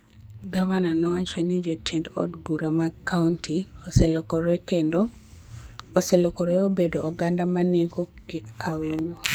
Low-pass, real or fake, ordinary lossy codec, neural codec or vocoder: none; fake; none; codec, 44.1 kHz, 2.6 kbps, SNAC